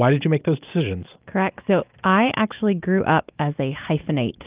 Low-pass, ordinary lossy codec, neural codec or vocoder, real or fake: 3.6 kHz; Opus, 32 kbps; none; real